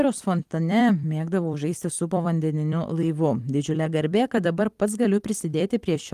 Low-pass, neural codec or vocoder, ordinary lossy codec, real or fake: 14.4 kHz; vocoder, 44.1 kHz, 128 mel bands every 256 samples, BigVGAN v2; Opus, 24 kbps; fake